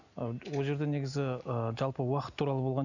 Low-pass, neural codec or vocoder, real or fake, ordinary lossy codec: 7.2 kHz; none; real; none